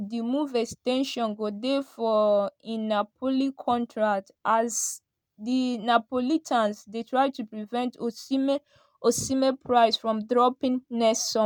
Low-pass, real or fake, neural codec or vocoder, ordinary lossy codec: none; real; none; none